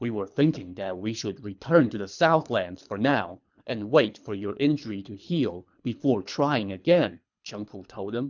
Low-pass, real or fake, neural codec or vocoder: 7.2 kHz; fake; codec, 24 kHz, 3 kbps, HILCodec